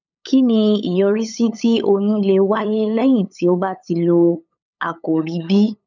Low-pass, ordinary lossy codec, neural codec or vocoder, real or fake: 7.2 kHz; none; codec, 16 kHz, 8 kbps, FunCodec, trained on LibriTTS, 25 frames a second; fake